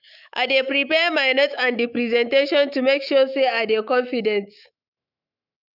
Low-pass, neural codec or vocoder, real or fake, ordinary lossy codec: 5.4 kHz; autoencoder, 48 kHz, 128 numbers a frame, DAC-VAE, trained on Japanese speech; fake; none